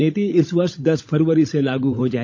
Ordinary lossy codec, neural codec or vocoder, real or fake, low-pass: none; codec, 16 kHz, 16 kbps, FunCodec, trained on LibriTTS, 50 frames a second; fake; none